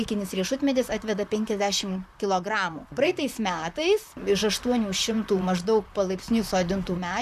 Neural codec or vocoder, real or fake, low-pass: vocoder, 44.1 kHz, 128 mel bands, Pupu-Vocoder; fake; 14.4 kHz